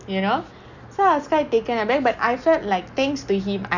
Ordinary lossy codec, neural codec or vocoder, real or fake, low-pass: none; none; real; 7.2 kHz